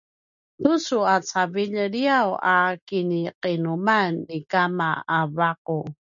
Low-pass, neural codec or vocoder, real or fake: 7.2 kHz; none; real